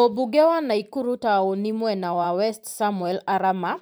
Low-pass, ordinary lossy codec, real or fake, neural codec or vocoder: none; none; fake; vocoder, 44.1 kHz, 128 mel bands every 512 samples, BigVGAN v2